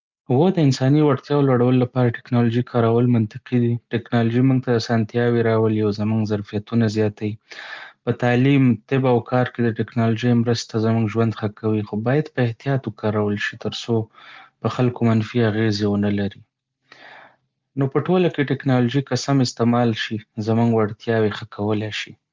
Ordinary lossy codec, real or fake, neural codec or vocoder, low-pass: Opus, 24 kbps; real; none; 7.2 kHz